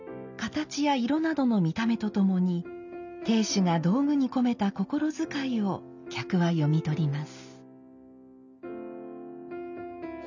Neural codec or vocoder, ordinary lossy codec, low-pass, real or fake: none; none; 7.2 kHz; real